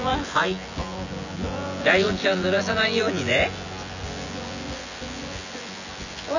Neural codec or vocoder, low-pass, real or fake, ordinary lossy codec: vocoder, 24 kHz, 100 mel bands, Vocos; 7.2 kHz; fake; none